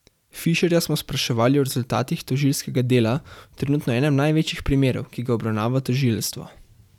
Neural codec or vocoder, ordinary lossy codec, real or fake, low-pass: vocoder, 44.1 kHz, 128 mel bands every 512 samples, BigVGAN v2; none; fake; 19.8 kHz